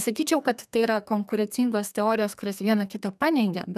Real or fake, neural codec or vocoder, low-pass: fake; codec, 32 kHz, 1.9 kbps, SNAC; 14.4 kHz